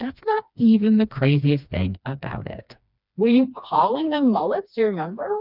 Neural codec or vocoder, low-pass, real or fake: codec, 16 kHz, 2 kbps, FreqCodec, smaller model; 5.4 kHz; fake